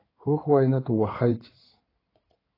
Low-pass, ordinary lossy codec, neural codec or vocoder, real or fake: 5.4 kHz; AAC, 24 kbps; codec, 16 kHz in and 24 kHz out, 2.2 kbps, FireRedTTS-2 codec; fake